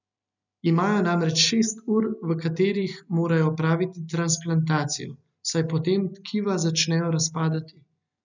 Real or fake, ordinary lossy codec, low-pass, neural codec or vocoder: real; none; 7.2 kHz; none